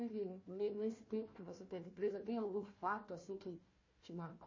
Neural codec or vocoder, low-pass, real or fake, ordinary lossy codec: codec, 16 kHz, 1 kbps, FunCodec, trained on Chinese and English, 50 frames a second; 7.2 kHz; fake; MP3, 32 kbps